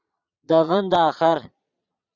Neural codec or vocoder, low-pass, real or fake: vocoder, 22.05 kHz, 80 mel bands, Vocos; 7.2 kHz; fake